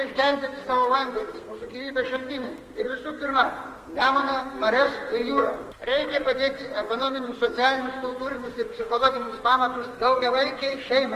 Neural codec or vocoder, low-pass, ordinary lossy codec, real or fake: codec, 32 kHz, 1.9 kbps, SNAC; 14.4 kHz; Opus, 24 kbps; fake